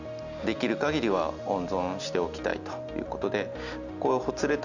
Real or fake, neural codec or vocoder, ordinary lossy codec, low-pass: real; none; none; 7.2 kHz